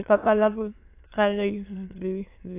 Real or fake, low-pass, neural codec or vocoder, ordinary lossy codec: fake; 3.6 kHz; autoencoder, 22.05 kHz, a latent of 192 numbers a frame, VITS, trained on many speakers; AAC, 24 kbps